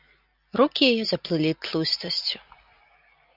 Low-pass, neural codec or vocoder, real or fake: 5.4 kHz; none; real